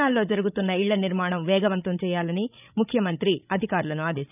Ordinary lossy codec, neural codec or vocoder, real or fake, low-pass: none; codec, 16 kHz, 16 kbps, FreqCodec, larger model; fake; 3.6 kHz